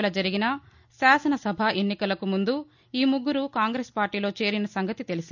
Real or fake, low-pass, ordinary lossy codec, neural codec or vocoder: real; 7.2 kHz; none; none